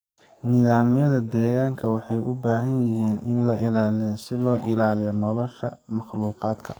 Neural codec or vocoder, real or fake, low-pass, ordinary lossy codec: codec, 44.1 kHz, 2.6 kbps, SNAC; fake; none; none